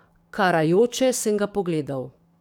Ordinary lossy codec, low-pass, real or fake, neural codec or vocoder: none; 19.8 kHz; fake; codec, 44.1 kHz, 7.8 kbps, DAC